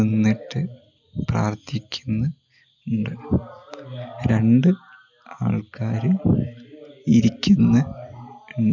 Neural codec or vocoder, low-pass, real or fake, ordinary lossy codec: none; 7.2 kHz; real; none